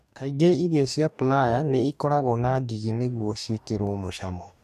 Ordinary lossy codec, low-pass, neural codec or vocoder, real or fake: none; 14.4 kHz; codec, 44.1 kHz, 2.6 kbps, DAC; fake